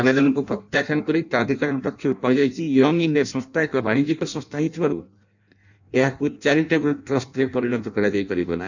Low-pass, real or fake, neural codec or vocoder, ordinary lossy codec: 7.2 kHz; fake; codec, 16 kHz in and 24 kHz out, 0.6 kbps, FireRedTTS-2 codec; none